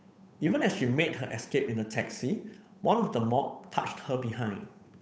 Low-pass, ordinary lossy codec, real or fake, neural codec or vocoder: none; none; fake; codec, 16 kHz, 8 kbps, FunCodec, trained on Chinese and English, 25 frames a second